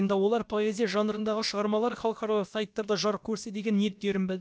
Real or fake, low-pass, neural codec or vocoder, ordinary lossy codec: fake; none; codec, 16 kHz, about 1 kbps, DyCAST, with the encoder's durations; none